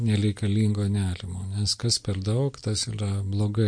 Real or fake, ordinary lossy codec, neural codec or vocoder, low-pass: real; MP3, 48 kbps; none; 9.9 kHz